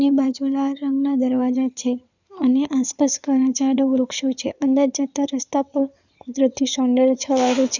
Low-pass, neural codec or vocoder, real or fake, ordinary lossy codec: 7.2 kHz; codec, 16 kHz in and 24 kHz out, 2.2 kbps, FireRedTTS-2 codec; fake; none